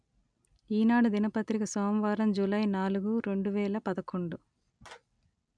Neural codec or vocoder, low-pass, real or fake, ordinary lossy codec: none; 9.9 kHz; real; none